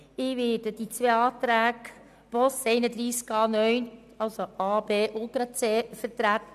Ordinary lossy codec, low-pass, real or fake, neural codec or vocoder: none; 14.4 kHz; real; none